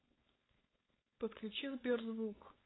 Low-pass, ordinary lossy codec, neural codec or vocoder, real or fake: 7.2 kHz; AAC, 16 kbps; codec, 16 kHz, 4.8 kbps, FACodec; fake